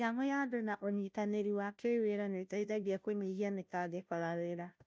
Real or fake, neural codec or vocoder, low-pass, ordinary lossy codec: fake; codec, 16 kHz, 0.5 kbps, FunCodec, trained on Chinese and English, 25 frames a second; none; none